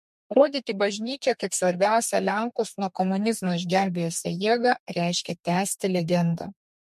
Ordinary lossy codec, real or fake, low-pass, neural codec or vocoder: MP3, 64 kbps; fake; 14.4 kHz; codec, 32 kHz, 1.9 kbps, SNAC